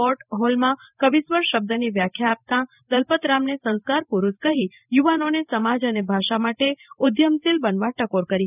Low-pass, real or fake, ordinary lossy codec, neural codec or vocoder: 3.6 kHz; fake; none; vocoder, 44.1 kHz, 128 mel bands every 512 samples, BigVGAN v2